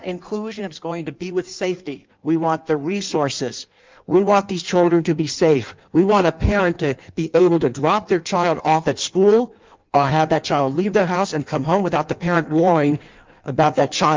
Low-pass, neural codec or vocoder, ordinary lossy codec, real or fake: 7.2 kHz; codec, 16 kHz in and 24 kHz out, 1.1 kbps, FireRedTTS-2 codec; Opus, 24 kbps; fake